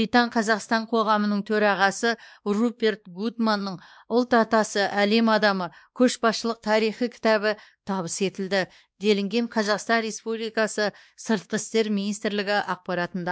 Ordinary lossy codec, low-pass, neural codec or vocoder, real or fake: none; none; codec, 16 kHz, 2 kbps, X-Codec, WavLM features, trained on Multilingual LibriSpeech; fake